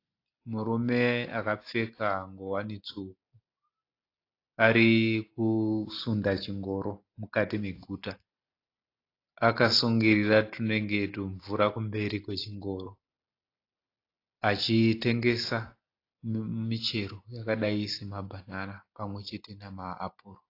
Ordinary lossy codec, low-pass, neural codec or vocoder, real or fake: AAC, 32 kbps; 5.4 kHz; none; real